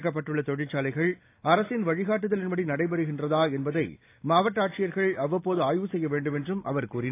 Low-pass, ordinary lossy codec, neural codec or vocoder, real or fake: 3.6 kHz; AAC, 24 kbps; none; real